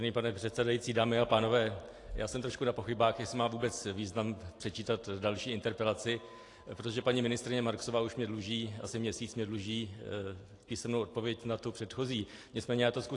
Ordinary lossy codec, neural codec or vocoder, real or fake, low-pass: AAC, 48 kbps; none; real; 10.8 kHz